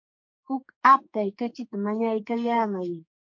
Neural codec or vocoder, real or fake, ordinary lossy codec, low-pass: codec, 44.1 kHz, 2.6 kbps, SNAC; fake; MP3, 48 kbps; 7.2 kHz